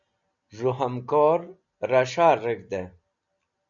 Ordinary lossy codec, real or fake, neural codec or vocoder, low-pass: MP3, 64 kbps; real; none; 7.2 kHz